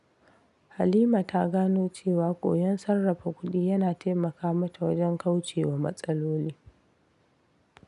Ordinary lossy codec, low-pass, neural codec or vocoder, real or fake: none; 10.8 kHz; none; real